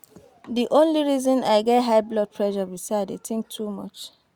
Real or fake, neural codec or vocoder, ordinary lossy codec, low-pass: real; none; Opus, 64 kbps; 19.8 kHz